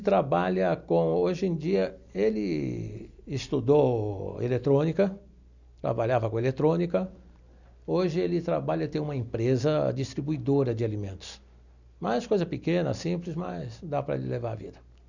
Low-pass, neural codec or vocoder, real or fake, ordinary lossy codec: 7.2 kHz; none; real; none